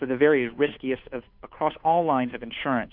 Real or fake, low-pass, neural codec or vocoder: fake; 5.4 kHz; codec, 16 kHz, 2 kbps, FunCodec, trained on Chinese and English, 25 frames a second